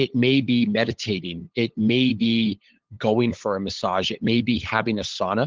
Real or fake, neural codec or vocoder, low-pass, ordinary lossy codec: fake; codec, 16 kHz, 8 kbps, FunCodec, trained on LibriTTS, 25 frames a second; 7.2 kHz; Opus, 16 kbps